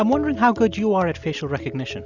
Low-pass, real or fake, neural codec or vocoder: 7.2 kHz; real; none